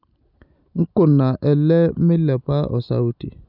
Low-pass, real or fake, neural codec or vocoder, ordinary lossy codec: 5.4 kHz; real; none; none